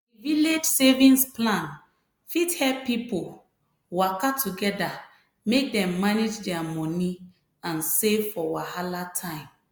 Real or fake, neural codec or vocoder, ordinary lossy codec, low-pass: real; none; none; none